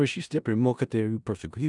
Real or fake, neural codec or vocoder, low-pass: fake; codec, 16 kHz in and 24 kHz out, 0.4 kbps, LongCat-Audio-Codec, four codebook decoder; 10.8 kHz